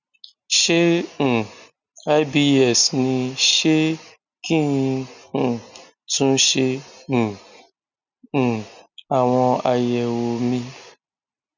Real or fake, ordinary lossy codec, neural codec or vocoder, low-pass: real; none; none; 7.2 kHz